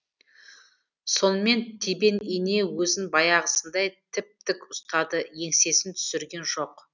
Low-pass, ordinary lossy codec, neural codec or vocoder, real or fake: 7.2 kHz; none; none; real